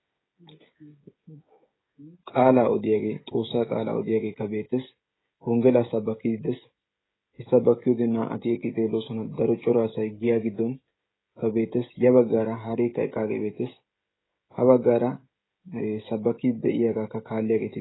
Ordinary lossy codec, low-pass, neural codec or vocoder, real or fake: AAC, 16 kbps; 7.2 kHz; codec, 16 kHz, 16 kbps, FreqCodec, smaller model; fake